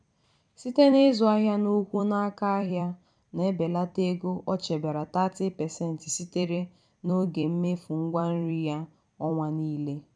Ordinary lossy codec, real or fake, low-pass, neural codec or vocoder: none; fake; 9.9 kHz; vocoder, 44.1 kHz, 128 mel bands every 256 samples, BigVGAN v2